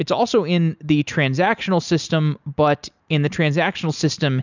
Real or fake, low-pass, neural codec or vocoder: real; 7.2 kHz; none